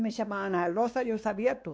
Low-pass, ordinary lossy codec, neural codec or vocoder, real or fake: none; none; codec, 16 kHz, 2 kbps, X-Codec, WavLM features, trained on Multilingual LibriSpeech; fake